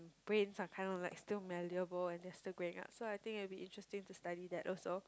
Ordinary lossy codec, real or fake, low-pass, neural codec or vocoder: none; real; none; none